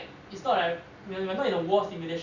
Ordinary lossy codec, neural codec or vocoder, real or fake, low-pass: Opus, 64 kbps; none; real; 7.2 kHz